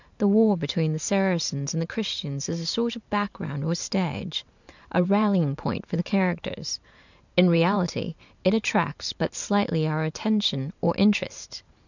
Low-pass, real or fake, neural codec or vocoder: 7.2 kHz; fake; vocoder, 44.1 kHz, 128 mel bands every 512 samples, BigVGAN v2